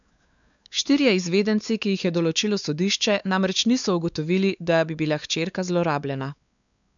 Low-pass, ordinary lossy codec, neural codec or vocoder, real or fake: 7.2 kHz; none; codec, 16 kHz, 4 kbps, X-Codec, WavLM features, trained on Multilingual LibriSpeech; fake